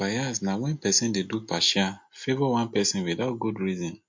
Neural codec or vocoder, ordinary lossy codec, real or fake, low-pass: none; MP3, 48 kbps; real; 7.2 kHz